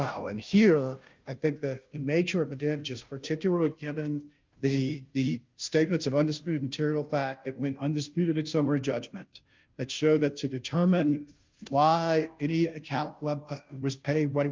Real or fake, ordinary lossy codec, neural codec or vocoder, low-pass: fake; Opus, 24 kbps; codec, 16 kHz, 0.5 kbps, FunCodec, trained on Chinese and English, 25 frames a second; 7.2 kHz